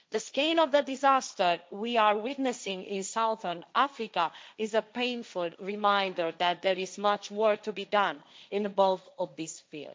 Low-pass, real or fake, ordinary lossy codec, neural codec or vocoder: none; fake; none; codec, 16 kHz, 1.1 kbps, Voila-Tokenizer